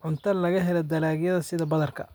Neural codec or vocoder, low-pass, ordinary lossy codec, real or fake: none; none; none; real